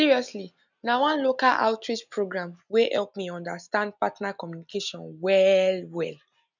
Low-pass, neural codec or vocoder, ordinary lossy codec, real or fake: 7.2 kHz; none; none; real